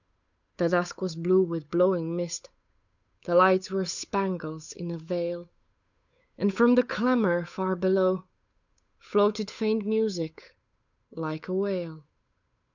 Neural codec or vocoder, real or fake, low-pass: codec, 16 kHz, 8 kbps, FunCodec, trained on Chinese and English, 25 frames a second; fake; 7.2 kHz